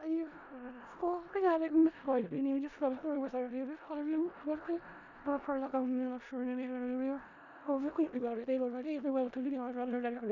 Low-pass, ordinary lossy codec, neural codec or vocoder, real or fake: 7.2 kHz; none; codec, 16 kHz in and 24 kHz out, 0.4 kbps, LongCat-Audio-Codec, four codebook decoder; fake